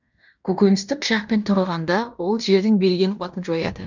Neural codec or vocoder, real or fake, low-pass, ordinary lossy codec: codec, 16 kHz in and 24 kHz out, 0.9 kbps, LongCat-Audio-Codec, fine tuned four codebook decoder; fake; 7.2 kHz; none